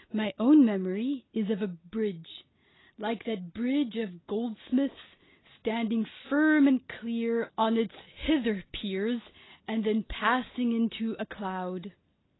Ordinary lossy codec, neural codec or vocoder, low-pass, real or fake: AAC, 16 kbps; none; 7.2 kHz; real